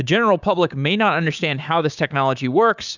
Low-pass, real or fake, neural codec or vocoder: 7.2 kHz; fake; codec, 16 kHz, 6 kbps, DAC